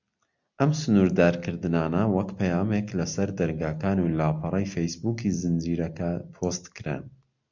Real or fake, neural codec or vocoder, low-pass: real; none; 7.2 kHz